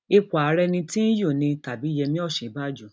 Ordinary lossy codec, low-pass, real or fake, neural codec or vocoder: none; none; real; none